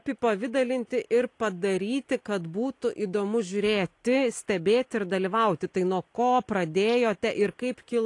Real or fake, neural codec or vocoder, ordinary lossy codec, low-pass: real; none; AAC, 48 kbps; 10.8 kHz